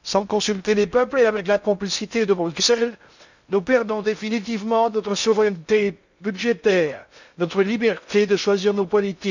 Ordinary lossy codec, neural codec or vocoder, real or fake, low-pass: none; codec, 16 kHz in and 24 kHz out, 0.6 kbps, FocalCodec, streaming, 2048 codes; fake; 7.2 kHz